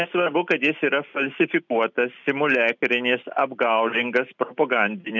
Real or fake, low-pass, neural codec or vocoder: real; 7.2 kHz; none